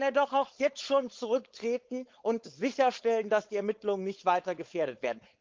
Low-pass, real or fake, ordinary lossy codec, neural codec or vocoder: 7.2 kHz; fake; Opus, 32 kbps; codec, 16 kHz, 4.8 kbps, FACodec